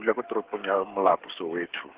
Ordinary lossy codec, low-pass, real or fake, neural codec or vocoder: Opus, 16 kbps; 3.6 kHz; fake; codec, 16 kHz, 8 kbps, FreqCodec, smaller model